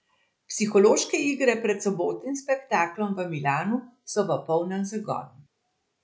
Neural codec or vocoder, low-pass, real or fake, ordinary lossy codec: none; none; real; none